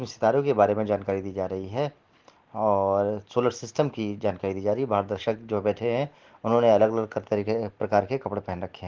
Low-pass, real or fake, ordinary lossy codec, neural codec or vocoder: 7.2 kHz; real; Opus, 16 kbps; none